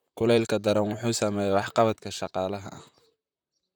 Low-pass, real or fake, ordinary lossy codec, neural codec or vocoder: none; fake; none; vocoder, 44.1 kHz, 128 mel bands, Pupu-Vocoder